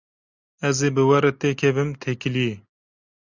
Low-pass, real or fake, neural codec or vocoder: 7.2 kHz; real; none